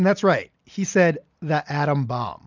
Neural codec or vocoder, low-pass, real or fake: none; 7.2 kHz; real